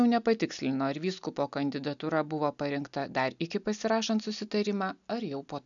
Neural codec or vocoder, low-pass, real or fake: none; 7.2 kHz; real